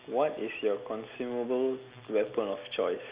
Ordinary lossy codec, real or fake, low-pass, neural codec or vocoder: Opus, 64 kbps; real; 3.6 kHz; none